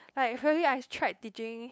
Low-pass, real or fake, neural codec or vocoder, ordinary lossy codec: none; fake; codec, 16 kHz, 8 kbps, FunCodec, trained on LibriTTS, 25 frames a second; none